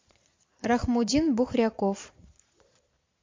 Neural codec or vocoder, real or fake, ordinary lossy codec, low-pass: vocoder, 22.05 kHz, 80 mel bands, Vocos; fake; MP3, 48 kbps; 7.2 kHz